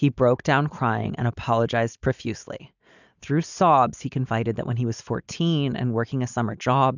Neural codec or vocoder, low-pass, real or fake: none; 7.2 kHz; real